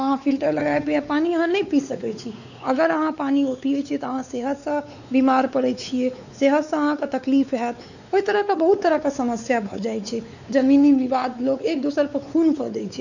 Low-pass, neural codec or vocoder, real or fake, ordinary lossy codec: 7.2 kHz; codec, 16 kHz, 4 kbps, X-Codec, WavLM features, trained on Multilingual LibriSpeech; fake; none